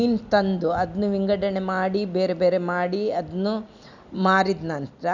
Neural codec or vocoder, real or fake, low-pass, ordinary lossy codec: none; real; 7.2 kHz; none